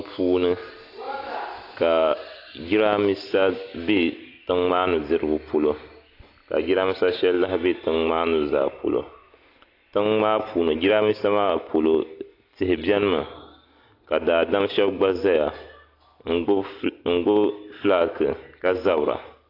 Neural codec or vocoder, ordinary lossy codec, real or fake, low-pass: none; AAC, 32 kbps; real; 5.4 kHz